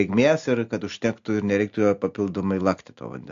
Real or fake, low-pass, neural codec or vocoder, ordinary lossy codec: real; 7.2 kHz; none; AAC, 64 kbps